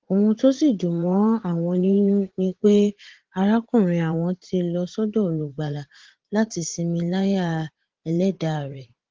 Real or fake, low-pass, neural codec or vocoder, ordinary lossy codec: fake; 7.2 kHz; vocoder, 44.1 kHz, 80 mel bands, Vocos; Opus, 16 kbps